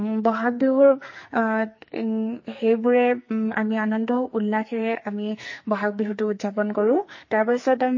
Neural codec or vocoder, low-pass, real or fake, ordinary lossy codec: codec, 44.1 kHz, 2.6 kbps, SNAC; 7.2 kHz; fake; MP3, 32 kbps